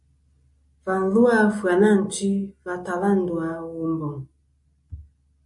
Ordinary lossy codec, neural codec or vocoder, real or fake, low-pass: MP3, 48 kbps; none; real; 10.8 kHz